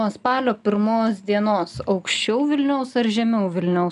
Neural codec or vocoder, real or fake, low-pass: none; real; 10.8 kHz